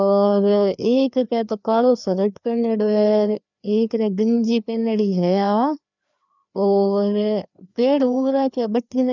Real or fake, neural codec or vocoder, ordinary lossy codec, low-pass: fake; codec, 16 kHz, 2 kbps, FreqCodec, larger model; none; 7.2 kHz